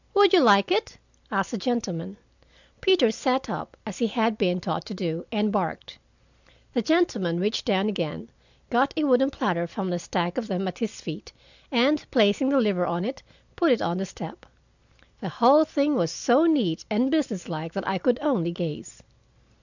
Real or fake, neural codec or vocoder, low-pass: real; none; 7.2 kHz